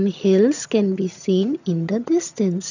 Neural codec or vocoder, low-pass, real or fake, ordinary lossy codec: vocoder, 22.05 kHz, 80 mel bands, HiFi-GAN; 7.2 kHz; fake; none